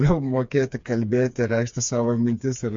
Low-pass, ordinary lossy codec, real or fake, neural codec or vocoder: 7.2 kHz; MP3, 48 kbps; fake; codec, 16 kHz, 4 kbps, FreqCodec, smaller model